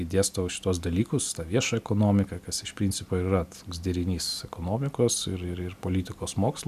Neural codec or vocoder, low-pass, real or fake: none; 14.4 kHz; real